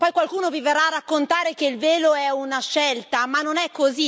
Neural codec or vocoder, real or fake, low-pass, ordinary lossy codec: none; real; none; none